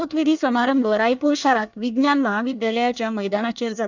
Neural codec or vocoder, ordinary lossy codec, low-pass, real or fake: codec, 24 kHz, 1 kbps, SNAC; none; 7.2 kHz; fake